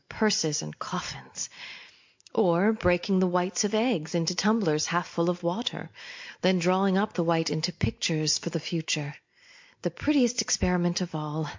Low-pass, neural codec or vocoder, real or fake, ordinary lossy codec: 7.2 kHz; none; real; MP3, 48 kbps